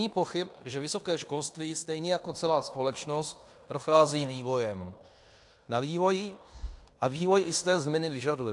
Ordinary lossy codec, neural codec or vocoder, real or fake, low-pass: AAC, 64 kbps; codec, 16 kHz in and 24 kHz out, 0.9 kbps, LongCat-Audio-Codec, fine tuned four codebook decoder; fake; 10.8 kHz